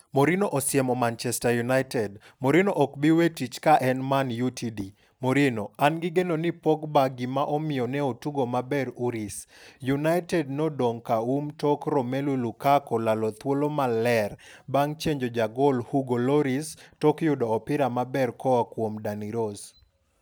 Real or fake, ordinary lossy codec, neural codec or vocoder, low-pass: real; none; none; none